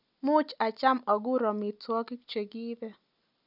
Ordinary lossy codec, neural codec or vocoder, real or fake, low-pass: none; none; real; 5.4 kHz